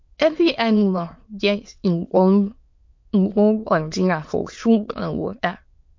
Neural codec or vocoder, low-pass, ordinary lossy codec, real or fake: autoencoder, 22.05 kHz, a latent of 192 numbers a frame, VITS, trained on many speakers; 7.2 kHz; MP3, 48 kbps; fake